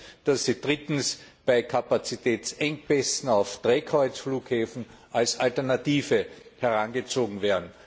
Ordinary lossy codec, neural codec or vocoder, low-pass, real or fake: none; none; none; real